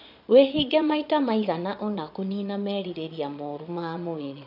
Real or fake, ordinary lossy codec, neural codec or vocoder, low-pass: fake; none; vocoder, 22.05 kHz, 80 mel bands, Vocos; 5.4 kHz